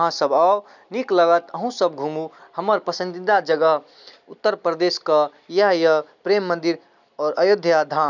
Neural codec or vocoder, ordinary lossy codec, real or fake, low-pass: none; none; real; 7.2 kHz